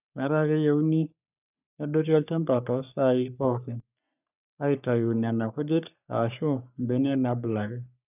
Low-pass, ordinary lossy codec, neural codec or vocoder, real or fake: 3.6 kHz; none; codec, 44.1 kHz, 3.4 kbps, Pupu-Codec; fake